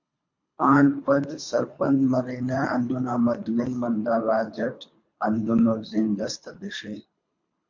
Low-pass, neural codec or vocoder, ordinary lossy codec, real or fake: 7.2 kHz; codec, 24 kHz, 3 kbps, HILCodec; MP3, 48 kbps; fake